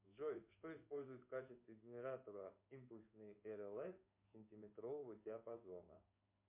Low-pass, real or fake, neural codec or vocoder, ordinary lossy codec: 3.6 kHz; fake; codec, 16 kHz in and 24 kHz out, 1 kbps, XY-Tokenizer; MP3, 24 kbps